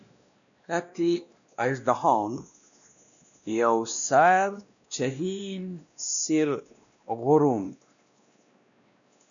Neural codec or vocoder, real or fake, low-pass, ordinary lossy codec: codec, 16 kHz, 1 kbps, X-Codec, WavLM features, trained on Multilingual LibriSpeech; fake; 7.2 kHz; MP3, 96 kbps